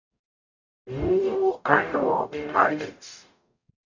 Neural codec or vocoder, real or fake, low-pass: codec, 44.1 kHz, 0.9 kbps, DAC; fake; 7.2 kHz